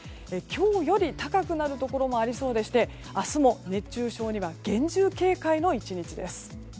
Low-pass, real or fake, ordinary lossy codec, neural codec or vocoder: none; real; none; none